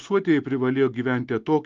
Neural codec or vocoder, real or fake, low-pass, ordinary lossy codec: none; real; 7.2 kHz; Opus, 32 kbps